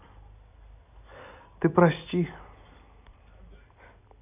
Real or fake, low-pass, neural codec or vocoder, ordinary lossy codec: real; 3.6 kHz; none; none